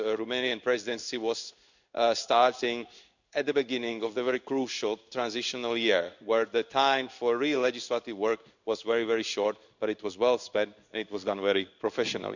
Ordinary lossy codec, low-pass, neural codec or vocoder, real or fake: none; 7.2 kHz; codec, 16 kHz in and 24 kHz out, 1 kbps, XY-Tokenizer; fake